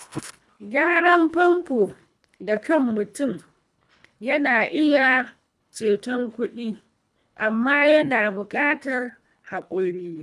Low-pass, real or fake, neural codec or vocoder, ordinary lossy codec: none; fake; codec, 24 kHz, 1.5 kbps, HILCodec; none